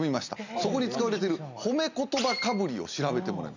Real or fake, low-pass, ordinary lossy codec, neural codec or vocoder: real; 7.2 kHz; none; none